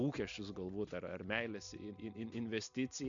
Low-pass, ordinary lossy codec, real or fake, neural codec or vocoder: 7.2 kHz; MP3, 64 kbps; real; none